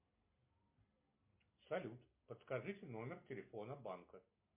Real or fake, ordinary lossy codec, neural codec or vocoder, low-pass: real; MP3, 24 kbps; none; 3.6 kHz